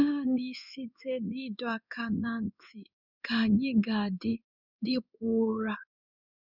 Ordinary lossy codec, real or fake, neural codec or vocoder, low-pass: none; fake; codec, 16 kHz in and 24 kHz out, 1 kbps, XY-Tokenizer; 5.4 kHz